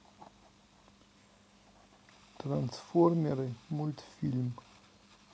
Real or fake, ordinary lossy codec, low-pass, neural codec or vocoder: real; none; none; none